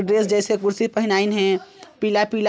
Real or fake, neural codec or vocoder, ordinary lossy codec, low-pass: real; none; none; none